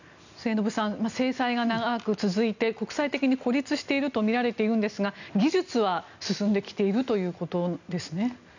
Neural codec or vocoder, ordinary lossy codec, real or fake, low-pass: none; none; real; 7.2 kHz